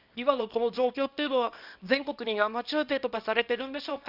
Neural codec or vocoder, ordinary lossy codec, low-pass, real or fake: codec, 24 kHz, 0.9 kbps, WavTokenizer, small release; Opus, 64 kbps; 5.4 kHz; fake